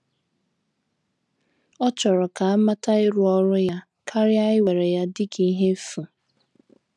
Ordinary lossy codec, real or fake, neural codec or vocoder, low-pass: none; real; none; none